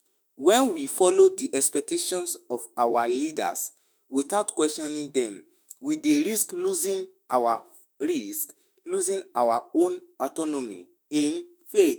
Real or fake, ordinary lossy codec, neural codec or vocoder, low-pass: fake; none; autoencoder, 48 kHz, 32 numbers a frame, DAC-VAE, trained on Japanese speech; none